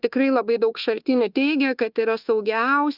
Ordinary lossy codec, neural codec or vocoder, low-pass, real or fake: Opus, 32 kbps; codec, 24 kHz, 1.2 kbps, DualCodec; 5.4 kHz; fake